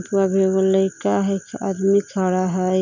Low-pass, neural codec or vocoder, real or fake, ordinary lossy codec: 7.2 kHz; none; real; none